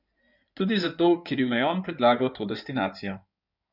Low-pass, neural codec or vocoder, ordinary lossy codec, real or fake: 5.4 kHz; codec, 16 kHz in and 24 kHz out, 2.2 kbps, FireRedTTS-2 codec; none; fake